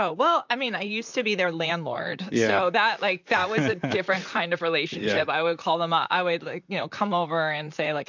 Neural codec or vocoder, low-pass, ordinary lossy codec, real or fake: vocoder, 44.1 kHz, 128 mel bands, Pupu-Vocoder; 7.2 kHz; MP3, 64 kbps; fake